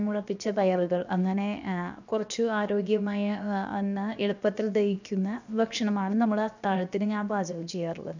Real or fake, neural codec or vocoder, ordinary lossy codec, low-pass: fake; codec, 16 kHz, 0.7 kbps, FocalCodec; none; 7.2 kHz